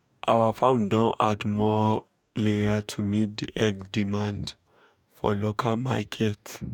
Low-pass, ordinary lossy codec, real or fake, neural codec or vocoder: 19.8 kHz; none; fake; codec, 44.1 kHz, 2.6 kbps, DAC